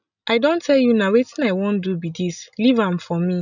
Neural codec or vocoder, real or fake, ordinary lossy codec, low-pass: none; real; none; 7.2 kHz